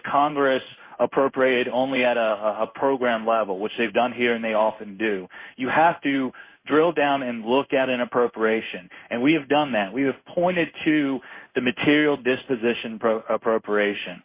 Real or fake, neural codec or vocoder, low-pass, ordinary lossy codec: fake; codec, 16 kHz in and 24 kHz out, 1 kbps, XY-Tokenizer; 3.6 kHz; Opus, 64 kbps